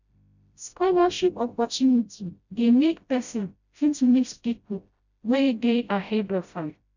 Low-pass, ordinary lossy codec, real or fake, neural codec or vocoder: 7.2 kHz; none; fake; codec, 16 kHz, 0.5 kbps, FreqCodec, smaller model